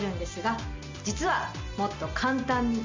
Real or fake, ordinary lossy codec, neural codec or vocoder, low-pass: real; MP3, 64 kbps; none; 7.2 kHz